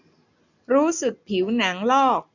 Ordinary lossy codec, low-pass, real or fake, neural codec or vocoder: none; 7.2 kHz; real; none